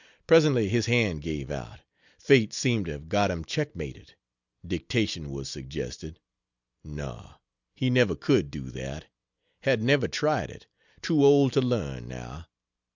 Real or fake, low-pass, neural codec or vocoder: real; 7.2 kHz; none